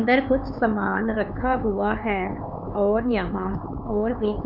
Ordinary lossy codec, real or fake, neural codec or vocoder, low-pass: none; fake; codec, 16 kHz, 4 kbps, X-Codec, HuBERT features, trained on LibriSpeech; 5.4 kHz